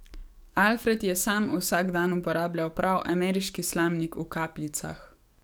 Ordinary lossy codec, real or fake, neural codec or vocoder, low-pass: none; fake; codec, 44.1 kHz, 7.8 kbps, DAC; none